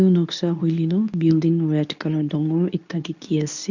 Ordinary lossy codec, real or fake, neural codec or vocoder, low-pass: none; fake; codec, 24 kHz, 0.9 kbps, WavTokenizer, medium speech release version 2; 7.2 kHz